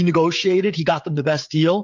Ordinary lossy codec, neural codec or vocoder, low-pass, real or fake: MP3, 64 kbps; codec, 16 kHz, 16 kbps, FreqCodec, smaller model; 7.2 kHz; fake